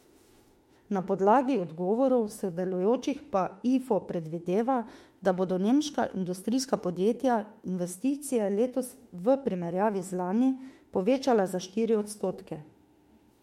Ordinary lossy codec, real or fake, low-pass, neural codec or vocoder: MP3, 64 kbps; fake; 19.8 kHz; autoencoder, 48 kHz, 32 numbers a frame, DAC-VAE, trained on Japanese speech